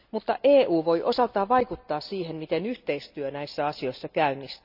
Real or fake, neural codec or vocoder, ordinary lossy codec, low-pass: real; none; none; 5.4 kHz